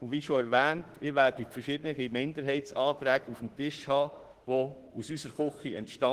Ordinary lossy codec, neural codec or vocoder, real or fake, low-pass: Opus, 16 kbps; autoencoder, 48 kHz, 32 numbers a frame, DAC-VAE, trained on Japanese speech; fake; 14.4 kHz